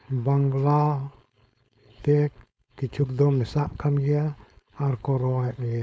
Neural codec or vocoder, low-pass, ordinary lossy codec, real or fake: codec, 16 kHz, 4.8 kbps, FACodec; none; none; fake